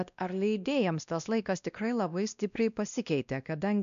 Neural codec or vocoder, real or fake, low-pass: codec, 16 kHz, 1 kbps, X-Codec, WavLM features, trained on Multilingual LibriSpeech; fake; 7.2 kHz